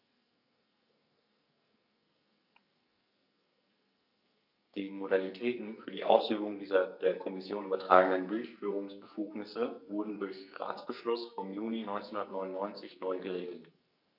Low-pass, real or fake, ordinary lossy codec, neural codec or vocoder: 5.4 kHz; fake; none; codec, 44.1 kHz, 2.6 kbps, SNAC